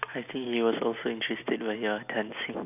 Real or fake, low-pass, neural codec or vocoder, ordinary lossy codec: real; 3.6 kHz; none; none